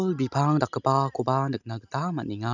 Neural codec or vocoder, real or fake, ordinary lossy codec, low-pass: none; real; none; 7.2 kHz